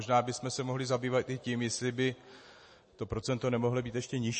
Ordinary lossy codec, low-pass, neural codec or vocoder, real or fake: MP3, 32 kbps; 10.8 kHz; none; real